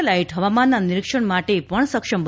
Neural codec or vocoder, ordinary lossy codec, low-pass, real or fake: none; none; none; real